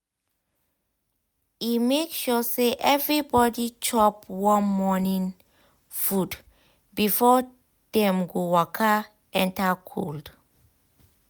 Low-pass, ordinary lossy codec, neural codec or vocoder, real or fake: none; none; none; real